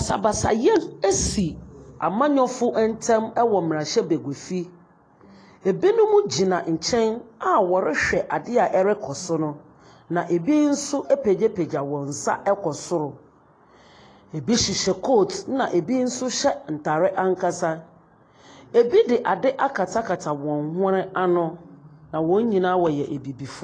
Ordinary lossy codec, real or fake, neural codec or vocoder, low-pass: AAC, 32 kbps; real; none; 9.9 kHz